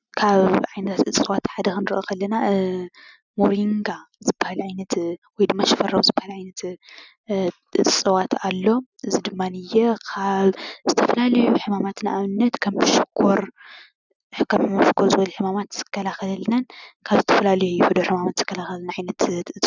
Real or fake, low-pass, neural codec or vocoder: real; 7.2 kHz; none